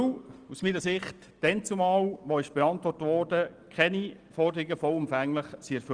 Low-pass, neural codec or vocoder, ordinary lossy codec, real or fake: 9.9 kHz; none; Opus, 24 kbps; real